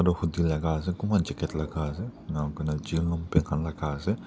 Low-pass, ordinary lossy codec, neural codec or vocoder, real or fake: none; none; none; real